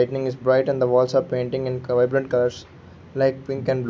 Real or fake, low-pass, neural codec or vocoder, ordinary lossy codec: real; none; none; none